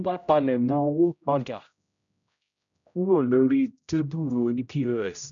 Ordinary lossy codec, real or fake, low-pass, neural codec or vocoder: none; fake; 7.2 kHz; codec, 16 kHz, 0.5 kbps, X-Codec, HuBERT features, trained on general audio